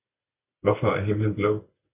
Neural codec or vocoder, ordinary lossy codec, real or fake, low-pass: none; MP3, 32 kbps; real; 3.6 kHz